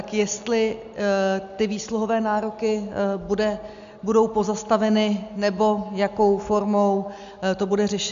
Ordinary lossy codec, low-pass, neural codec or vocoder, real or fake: MP3, 64 kbps; 7.2 kHz; none; real